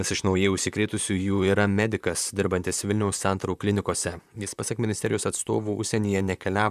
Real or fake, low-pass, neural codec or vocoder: fake; 14.4 kHz; vocoder, 44.1 kHz, 128 mel bands, Pupu-Vocoder